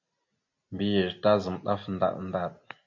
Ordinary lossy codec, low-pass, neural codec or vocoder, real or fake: MP3, 48 kbps; 7.2 kHz; none; real